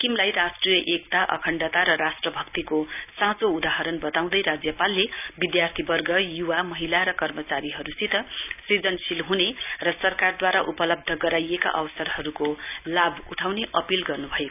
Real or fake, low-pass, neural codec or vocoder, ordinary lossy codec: real; 3.6 kHz; none; none